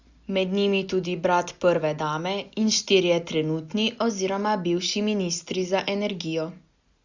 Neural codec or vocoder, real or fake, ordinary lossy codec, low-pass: none; real; Opus, 64 kbps; 7.2 kHz